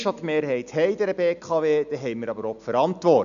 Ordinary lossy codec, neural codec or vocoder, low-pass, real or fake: none; none; 7.2 kHz; real